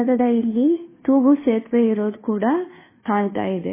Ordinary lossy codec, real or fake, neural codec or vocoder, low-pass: MP3, 16 kbps; fake; codec, 16 kHz, 2 kbps, FunCodec, trained on Chinese and English, 25 frames a second; 3.6 kHz